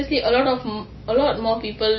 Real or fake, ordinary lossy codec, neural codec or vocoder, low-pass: real; MP3, 24 kbps; none; 7.2 kHz